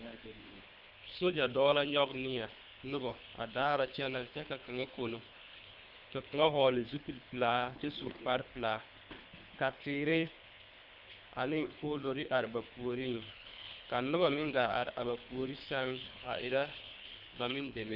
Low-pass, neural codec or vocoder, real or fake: 5.4 kHz; codec, 24 kHz, 3 kbps, HILCodec; fake